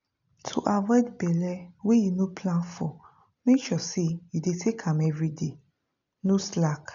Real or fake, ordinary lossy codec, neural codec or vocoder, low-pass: real; none; none; 7.2 kHz